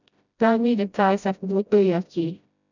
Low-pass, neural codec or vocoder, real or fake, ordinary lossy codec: 7.2 kHz; codec, 16 kHz, 0.5 kbps, FreqCodec, smaller model; fake; none